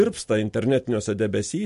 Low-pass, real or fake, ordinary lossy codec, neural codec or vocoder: 14.4 kHz; real; MP3, 48 kbps; none